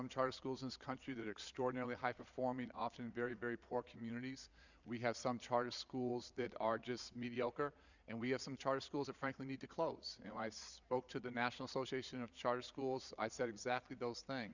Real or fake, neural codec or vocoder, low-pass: fake; vocoder, 22.05 kHz, 80 mel bands, Vocos; 7.2 kHz